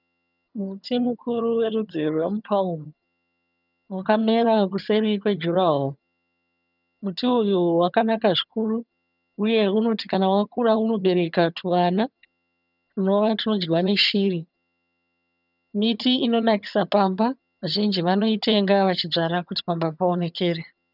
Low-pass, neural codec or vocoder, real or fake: 5.4 kHz; vocoder, 22.05 kHz, 80 mel bands, HiFi-GAN; fake